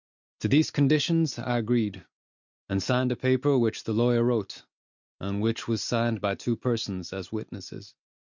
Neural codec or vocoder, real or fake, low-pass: none; real; 7.2 kHz